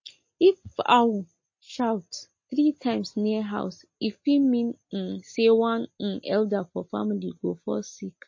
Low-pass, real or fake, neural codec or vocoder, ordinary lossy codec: 7.2 kHz; real; none; MP3, 32 kbps